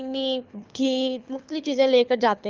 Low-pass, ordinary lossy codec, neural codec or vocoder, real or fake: 7.2 kHz; Opus, 32 kbps; codec, 24 kHz, 6 kbps, HILCodec; fake